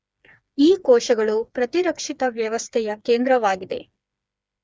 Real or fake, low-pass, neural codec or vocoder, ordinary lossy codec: fake; none; codec, 16 kHz, 4 kbps, FreqCodec, smaller model; none